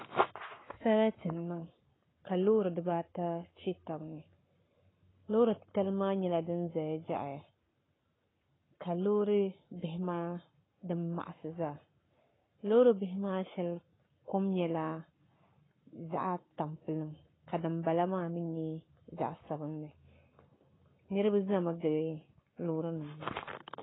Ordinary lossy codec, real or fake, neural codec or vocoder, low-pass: AAC, 16 kbps; fake; codec, 44.1 kHz, 7.8 kbps, Pupu-Codec; 7.2 kHz